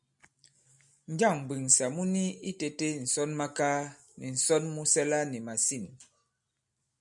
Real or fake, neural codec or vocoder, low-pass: real; none; 10.8 kHz